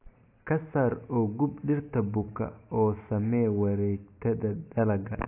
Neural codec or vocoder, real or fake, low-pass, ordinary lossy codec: none; real; 3.6 kHz; AAC, 24 kbps